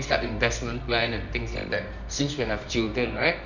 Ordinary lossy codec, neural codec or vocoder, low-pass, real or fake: none; codec, 24 kHz, 0.9 kbps, WavTokenizer, medium music audio release; 7.2 kHz; fake